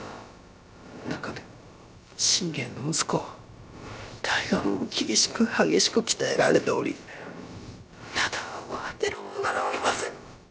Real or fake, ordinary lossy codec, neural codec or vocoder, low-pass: fake; none; codec, 16 kHz, about 1 kbps, DyCAST, with the encoder's durations; none